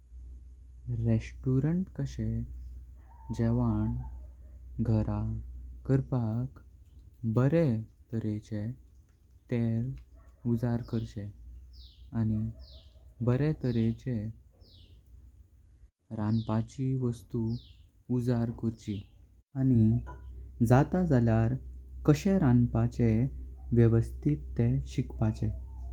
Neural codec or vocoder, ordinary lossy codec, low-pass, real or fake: none; Opus, 32 kbps; 19.8 kHz; real